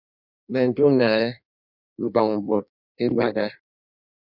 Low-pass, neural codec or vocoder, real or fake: 5.4 kHz; codec, 16 kHz in and 24 kHz out, 1.1 kbps, FireRedTTS-2 codec; fake